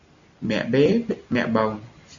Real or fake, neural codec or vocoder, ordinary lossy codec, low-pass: real; none; Opus, 64 kbps; 7.2 kHz